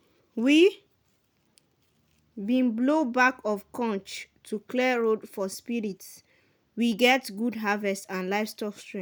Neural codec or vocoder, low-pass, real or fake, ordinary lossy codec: none; none; real; none